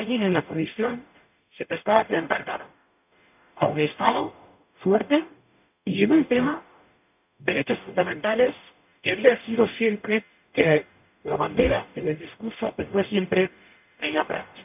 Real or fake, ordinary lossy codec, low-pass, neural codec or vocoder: fake; none; 3.6 kHz; codec, 44.1 kHz, 0.9 kbps, DAC